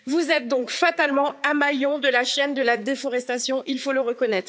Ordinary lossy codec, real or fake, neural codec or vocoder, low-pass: none; fake; codec, 16 kHz, 4 kbps, X-Codec, HuBERT features, trained on balanced general audio; none